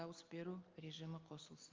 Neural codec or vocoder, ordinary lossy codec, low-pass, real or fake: none; Opus, 32 kbps; 7.2 kHz; real